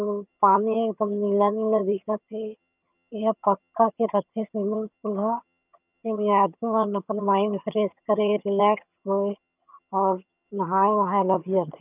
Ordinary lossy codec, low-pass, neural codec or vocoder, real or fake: none; 3.6 kHz; vocoder, 22.05 kHz, 80 mel bands, HiFi-GAN; fake